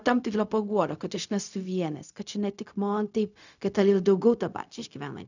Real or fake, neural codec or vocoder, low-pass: fake; codec, 16 kHz, 0.4 kbps, LongCat-Audio-Codec; 7.2 kHz